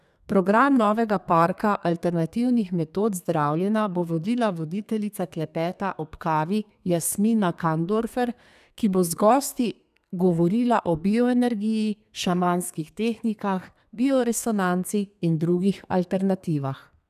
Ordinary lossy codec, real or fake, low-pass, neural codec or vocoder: none; fake; 14.4 kHz; codec, 32 kHz, 1.9 kbps, SNAC